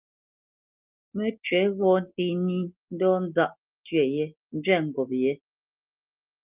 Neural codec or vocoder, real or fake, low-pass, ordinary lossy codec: none; real; 3.6 kHz; Opus, 32 kbps